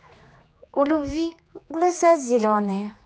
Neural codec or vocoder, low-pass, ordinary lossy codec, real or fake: codec, 16 kHz, 2 kbps, X-Codec, HuBERT features, trained on general audio; none; none; fake